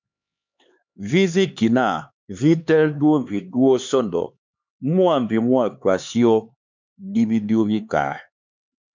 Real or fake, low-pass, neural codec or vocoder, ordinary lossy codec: fake; 7.2 kHz; codec, 16 kHz, 4 kbps, X-Codec, HuBERT features, trained on LibriSpeech; MP3, 64 kbps